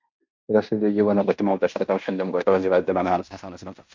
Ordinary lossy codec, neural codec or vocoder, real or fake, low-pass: MP3, 64 kbps; codec, 16 kHz in and 24 kHz out, 0.9 kbps, LongCat-Audio-Codec, fine tuned four codebook decoder; fake; 7.2 kHz